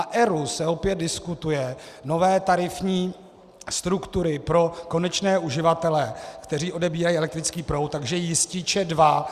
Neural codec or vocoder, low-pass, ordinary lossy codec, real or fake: none; 14.4 kHz; Opus, 64 kbps; real